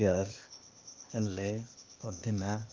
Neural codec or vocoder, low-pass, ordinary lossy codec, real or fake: codec, 16 kHz, 0.8 kbps, ZipCodec; 7.2 kHz; Opus, 32 kbps; fake